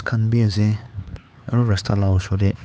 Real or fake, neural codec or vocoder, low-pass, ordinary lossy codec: fake; codec, 16 kHz, 4 kbps, X-Codec, HuBERT features, trained on LibriSpeech; none; none